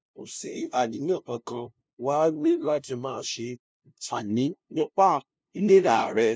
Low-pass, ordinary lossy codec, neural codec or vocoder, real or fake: none; none; codec, 16 kHz, 0.5 kbps, FunCodec, trained on LibriTTS, 25 frames a second; fake